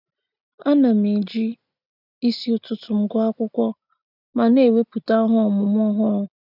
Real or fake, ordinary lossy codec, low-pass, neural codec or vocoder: real; none; 5.4 kHz; none